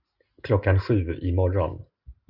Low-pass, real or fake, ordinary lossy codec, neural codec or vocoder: 5.4 kHz; real; Opus, 64 kbps; none